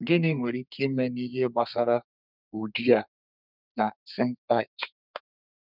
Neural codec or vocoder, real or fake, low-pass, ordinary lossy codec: codec, 32 kHz, 1.9 kbps, SNAC; fake; 5.4 kHz; none